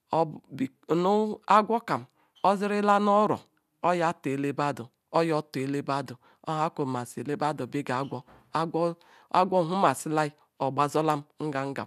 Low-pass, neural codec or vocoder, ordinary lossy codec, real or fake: 14.4 kHz; none; none; real